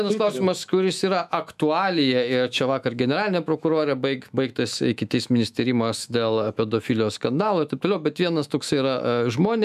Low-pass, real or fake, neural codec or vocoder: 14.4 kHz; fake; autoencoder, 48 kHz, 128 numbers a frame, DAC-VAE, trained on Japanese speech